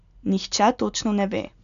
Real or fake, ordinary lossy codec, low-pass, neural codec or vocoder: real; none; 7.2 kHz; none